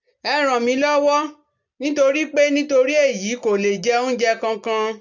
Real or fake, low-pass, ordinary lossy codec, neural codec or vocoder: real; 7.2 kHz; none; none